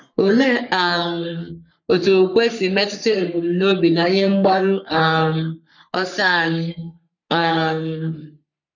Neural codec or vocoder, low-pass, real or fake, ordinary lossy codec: codec, 44.1 kHz, 3.4 kbps, Pupu-Codec; 7.2 kHz; fake; none